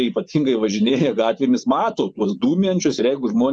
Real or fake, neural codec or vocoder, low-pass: fake; vocoder, 24 kHz, 100 mel bands, Vocos; 9.9 kHz